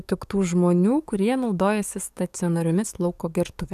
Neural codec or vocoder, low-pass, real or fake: codec, 44.1 kHz, 7.8 kbps, DAC; 14.4 kHz; fake